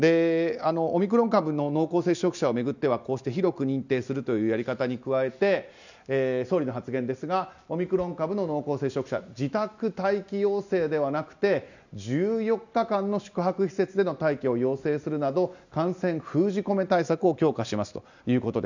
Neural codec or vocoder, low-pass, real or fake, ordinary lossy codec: none; 7.2 kHz; real; none